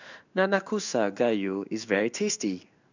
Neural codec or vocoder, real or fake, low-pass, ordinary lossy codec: codec, 16 kHz in and 24 kHz out, 1 kbps, XY-Tokenizer; fake; 7.2 kHz; none